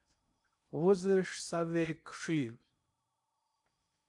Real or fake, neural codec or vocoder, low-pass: fake; codec, 16 kHz in and 24 kHz out, 0.6 kbps, FocalCodec, streaming, 2048 codes; 10.8 kHz